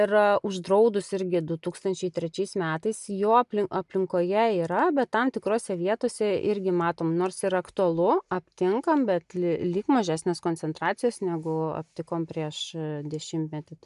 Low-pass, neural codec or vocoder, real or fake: 10.8 kHz; none; real